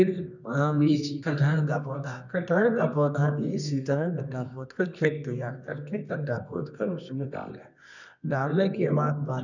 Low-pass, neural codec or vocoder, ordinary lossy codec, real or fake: 7.2 kHz; codec, 24 kHz, 0.9 kbps, WavTokenizer, medium music audio release; none; fake